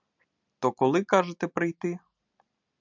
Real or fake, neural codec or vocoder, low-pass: real; none; 7.2 kHz